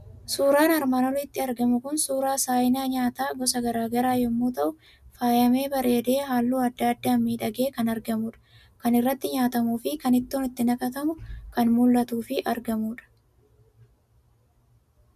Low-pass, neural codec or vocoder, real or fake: 14.4 kHz; none; real